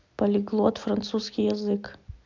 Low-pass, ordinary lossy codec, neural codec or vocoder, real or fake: 7.2 kHz; MP3, 64 kbps; none; real